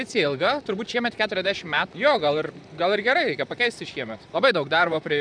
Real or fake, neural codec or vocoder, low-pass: fake; vocoder, 44.1 kHz, 128 mel bands, Pupu-Vocoder; 9.9 kHz